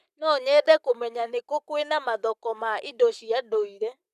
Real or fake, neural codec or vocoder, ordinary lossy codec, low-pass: fake; codec, 44.1 kHz, 7.8 kbps, Pupu-Codec; none; 14.4 kHz